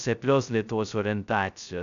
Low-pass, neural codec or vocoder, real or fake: 7.2 kHz; codec, 16 kHz, 0.2 kbps, FocalCodec; fake